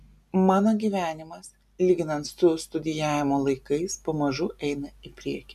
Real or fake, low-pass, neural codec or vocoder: real; 14.4 kHz; none